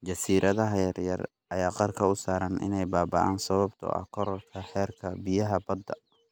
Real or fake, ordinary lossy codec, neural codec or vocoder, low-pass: real; none; none; none